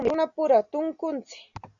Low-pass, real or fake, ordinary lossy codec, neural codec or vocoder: 7.2 kHz; real; MP3, 96 kbps; none